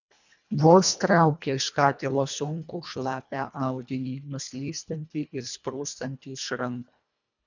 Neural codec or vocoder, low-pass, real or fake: codec, 24 kHz, 1.5 kbps, HILCodec; 7.2 kHz; fake